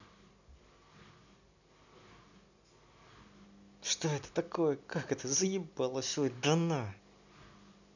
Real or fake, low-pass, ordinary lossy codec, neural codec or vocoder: real; 7.2 kHz; none; none